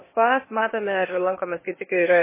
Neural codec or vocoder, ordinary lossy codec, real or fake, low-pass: codec, 16 kHz, 0.8 kbps, ZipCodec; MP3, 16 kbps; fake; 3.6 kHz